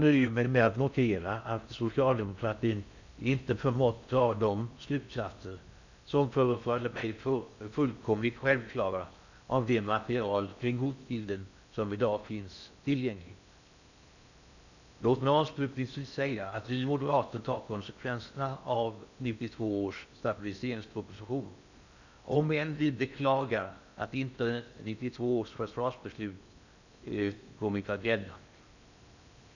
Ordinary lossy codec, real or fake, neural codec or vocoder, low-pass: none; fake; codec, 16 kHz in and 24 kHz out, 0.6 kbps, FocalCodec, streaming, 4096 codes; 7.2 kHz